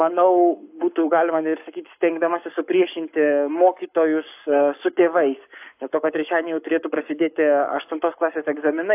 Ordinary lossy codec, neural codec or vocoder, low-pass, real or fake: AAC, 32 kbps; codec, 44.1 kHz, 7.8 kbps, Pupu-Codec; 3.6 kHz; fake